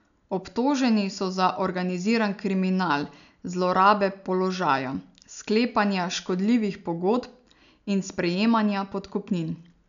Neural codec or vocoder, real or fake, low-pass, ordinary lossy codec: none; real; 7.2 kHz; none